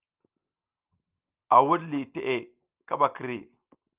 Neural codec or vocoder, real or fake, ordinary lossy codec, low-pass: none; real; Opus, 24 kbps; 3.6 kHz